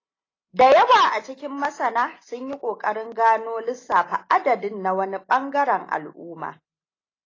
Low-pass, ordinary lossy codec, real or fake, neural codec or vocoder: 7.2 kHz; AAC, 32 kbps; real; none